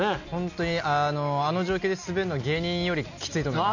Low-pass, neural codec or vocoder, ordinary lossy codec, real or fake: 7.2 kHz; none; none; real